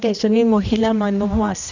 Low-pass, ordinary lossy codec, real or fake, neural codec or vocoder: 7.2 kHz; none; fake; codec, 16 kHz, 1 kbps, X-Codec, HuBERT features, trained on general audio